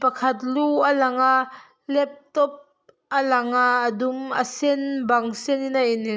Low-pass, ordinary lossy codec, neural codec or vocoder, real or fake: none; none; none; real